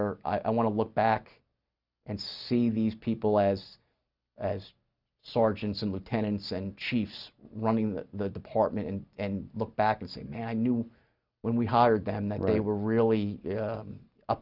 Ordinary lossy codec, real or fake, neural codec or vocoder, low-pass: Opus, 64 kbps; real; none; 5.4 kHz